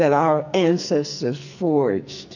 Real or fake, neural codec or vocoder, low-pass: fake; codec, 44.1 kHz, 2.6 kbps, SNAC; 7.2 kHz